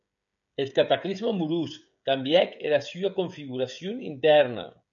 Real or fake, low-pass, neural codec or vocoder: fake; 7.2 kHz; codec, 16 kHz, 16 kbps, FreqCodec, smaller model